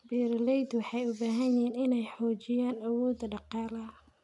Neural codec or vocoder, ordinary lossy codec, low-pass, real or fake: none; none; 10.8 kHz; real